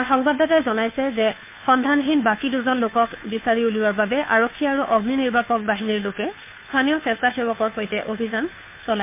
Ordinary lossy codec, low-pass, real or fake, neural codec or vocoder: MP3, 32 kbps; 3.6 kHz; fake; codec, 16 kHz, 2 kbps, FunCodec, trained on Chinese and English, 25 frames a second